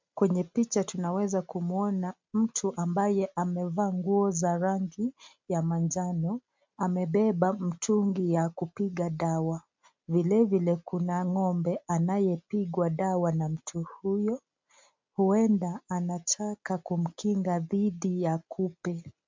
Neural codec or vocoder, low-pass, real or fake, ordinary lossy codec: none; 7.2 kHz; real; MP3, 64 kbps